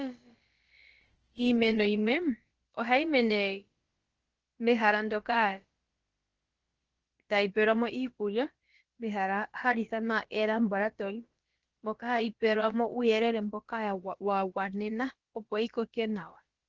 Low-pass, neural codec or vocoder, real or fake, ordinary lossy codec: 7.2 kHz; codec, 16 kHz, about 1 kbps, DyCAST, with the encoder's durations; fake; Opus, 16 kbps